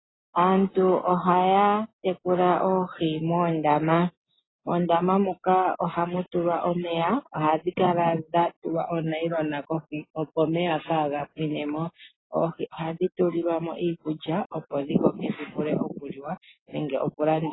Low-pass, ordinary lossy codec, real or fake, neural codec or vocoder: 7.2 kHz; AAC, 16 kbps; real; none